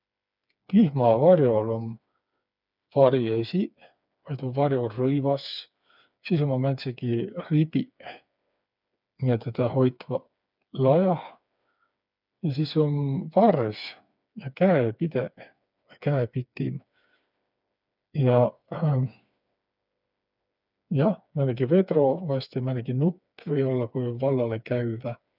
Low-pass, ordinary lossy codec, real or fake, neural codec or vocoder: 5.4 kHz; none; fake; codec, 16 kHz, 4 kbps, FreqCodec, smaller model